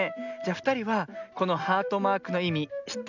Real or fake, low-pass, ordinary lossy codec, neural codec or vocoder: real; 7.2 kHz; none; none